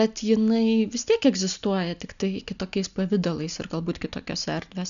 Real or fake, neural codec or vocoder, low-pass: real; none; 7.2 kHz